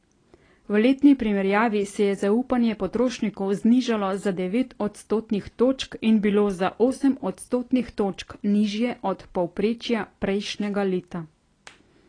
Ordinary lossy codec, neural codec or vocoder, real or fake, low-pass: AAC, 32 kbps; none; real; 9.9 kHz